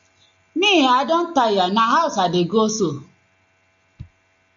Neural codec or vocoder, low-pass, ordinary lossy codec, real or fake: none; 7.2 kHz; AAC, 64 kbps; real